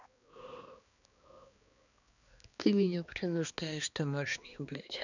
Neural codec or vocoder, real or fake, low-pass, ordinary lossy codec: codec, 16 kHz, 2 kbps, X-Codec, HuBERT features, trained on balanced general audio; fake; 7.2 kHz; none